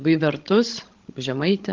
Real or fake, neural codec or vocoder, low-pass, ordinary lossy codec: fake; codec, 16 kHz, 16 kbps, FreqCodec, larger model; 7.2 kHz; Opus, 16 kbps